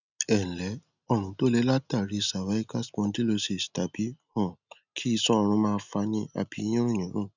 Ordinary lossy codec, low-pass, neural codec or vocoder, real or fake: none; 7.2 kHz; none; real